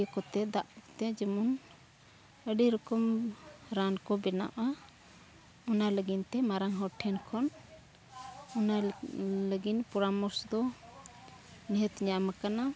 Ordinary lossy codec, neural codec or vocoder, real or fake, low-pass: none; none; real; none